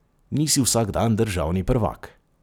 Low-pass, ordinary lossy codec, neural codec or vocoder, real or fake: none; none; none; real